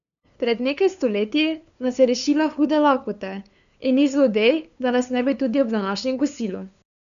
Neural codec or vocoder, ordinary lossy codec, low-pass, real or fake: codec, 16 kHz, 2 kbps, FunCodec, trained on LibriTTS, 25 frames a second; none; 7.2 kHz; fake